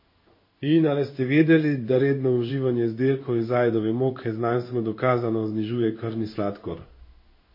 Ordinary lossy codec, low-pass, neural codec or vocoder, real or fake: MP3, 24 kbps; 5.4 kHz; codec, 16 kHz in and 24 kHz out, 1 kbps, XY-Tokenizer; fake